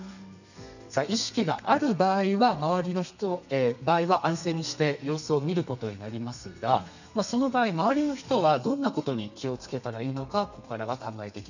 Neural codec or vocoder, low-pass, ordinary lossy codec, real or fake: codec, 32 kHz, 1.9 kbps, SNAC; 7.2 kHz; none; fake